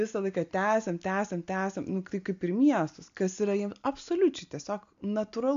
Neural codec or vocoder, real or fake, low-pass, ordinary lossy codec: none; real; 7.2 kHz; MP3, 64 kbps